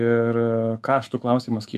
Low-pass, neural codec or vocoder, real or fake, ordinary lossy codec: 14.4 kHz; autoencoder, 48 kHz, 128 numbers a frame, DAC-VAE, trained on Japanese speech; fake; AAC, 96 kbps